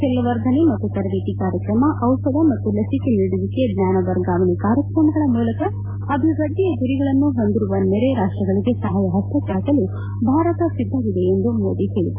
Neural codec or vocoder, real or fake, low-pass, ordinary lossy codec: none; real; 3.6 kHz; MP3, 24 kbps